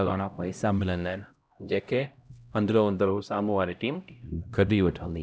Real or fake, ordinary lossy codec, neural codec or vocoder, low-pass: fake; none; codec, 16 kHz, 0.5 kbps, X-Codec, HuBERT features, trained on LibriSpeech; none